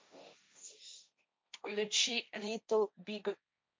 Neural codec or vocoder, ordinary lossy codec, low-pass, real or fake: codec, 16 kHz, 1.1 kbps, Voila-Tokenizer; none; none; fake